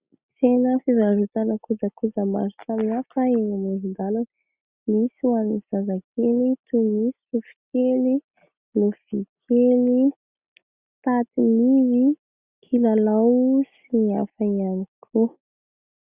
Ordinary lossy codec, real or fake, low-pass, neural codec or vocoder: Opus, 64 kbps; real; 3.6 kHz; none